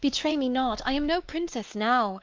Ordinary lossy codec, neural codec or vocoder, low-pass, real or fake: Opus, 24 kbps; codec, 16 kHz, 2 kbps, X-Codec, WavLM features, trained on Multilingual LibriSpeech; 7.2 kHz; fake